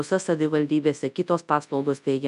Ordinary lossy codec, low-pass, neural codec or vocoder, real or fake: MP3, 96 kbps; 10.8 kHz; codec, 24 kHz, 0.9 kbps, WavTokenizer, large speech release; fake